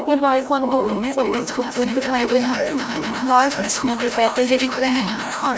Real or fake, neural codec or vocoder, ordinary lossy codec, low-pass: fake; codec, 16 kHz, 0.5 kbps, FreqCodec, larger model; none; none